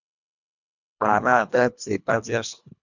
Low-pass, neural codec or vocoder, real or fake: 7.2 kHz; codec, 24 kHz, 1.5 kbps, HILCodec; fake